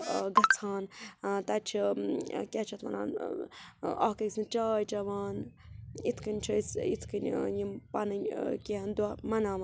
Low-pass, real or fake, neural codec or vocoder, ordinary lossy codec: none; real; none; none